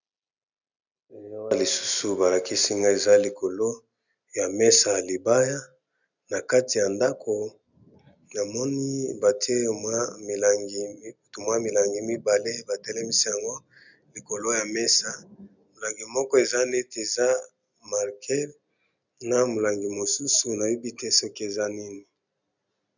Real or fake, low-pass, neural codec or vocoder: real; 7.2 kHz; none